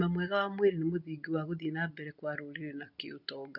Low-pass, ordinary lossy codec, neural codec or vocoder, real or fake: 5.4 kHz; none; none; real